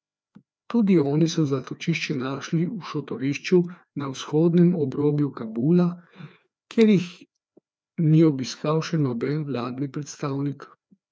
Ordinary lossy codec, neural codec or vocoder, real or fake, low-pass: none; codec, 16 kHz, 2 kbps, FreqCodec, larger model; fake; none